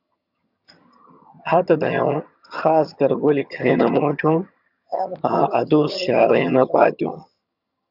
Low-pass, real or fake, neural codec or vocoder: 5.4 kHz; fake; vocoder, 22.05 kHz, 80 mel bands, HiFi-GAN